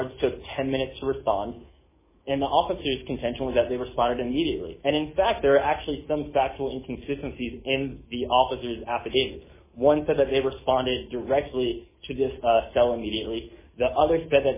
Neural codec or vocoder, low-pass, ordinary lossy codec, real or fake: autoencoder, 48 kHz, 128 numbers a frame, DAC-VAE, trained on Japanese speech; 3.6 kHz; MP3, 16 kbps; fake